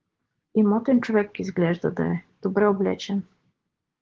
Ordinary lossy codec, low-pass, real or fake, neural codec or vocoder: Opus, 16 kbps; 7.2 kHz; fake; codec, 16 kHz, 6 kbps, DAC